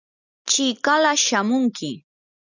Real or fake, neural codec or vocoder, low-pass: real; none; 7.2 kHz